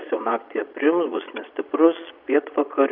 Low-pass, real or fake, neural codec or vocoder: 5.4 kHz; fake; vocoder, 22.05 kHz, 80 mel bands, Vocos